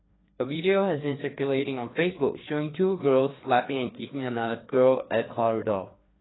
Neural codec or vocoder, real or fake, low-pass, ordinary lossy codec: codec, 16 kHz, 2 kbps, FreqCodec, larger model; fake; 7.2 kHz; AAC, 16 kbps